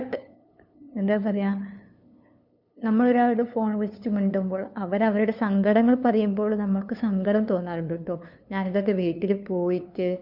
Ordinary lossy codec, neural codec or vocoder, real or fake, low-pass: none; codec, 16 kHz, 2 kbps, FunCodec, trained on LibriTTS, 25 frames a second; fake; 5.4 kHz